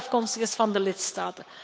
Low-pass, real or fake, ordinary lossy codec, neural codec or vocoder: none; fake; none; codec, 16 kHz, 8 kbps, FunCodec, trained on Chinese and English, 25 frames a second